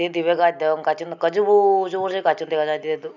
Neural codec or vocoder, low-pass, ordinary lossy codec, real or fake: none; 7.2 kHz; none; real